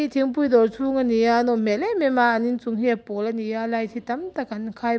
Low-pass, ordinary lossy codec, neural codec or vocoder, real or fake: none; none; none; real